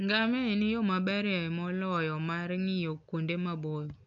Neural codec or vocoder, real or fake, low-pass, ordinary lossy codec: none; real; 7.2 kHz; none